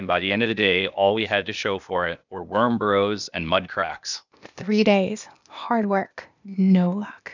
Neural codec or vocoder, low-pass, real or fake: codec, 16 kHz, 0.8 kbps, ZipCodec; 7.2 kHz; fake